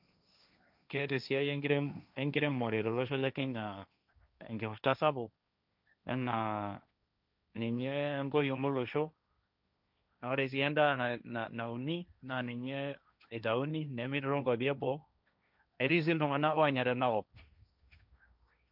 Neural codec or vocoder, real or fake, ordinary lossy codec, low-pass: codec, 16 kHz, 1.1 kbps, Voila-Tokenizer; fake; none; 5.4 kHz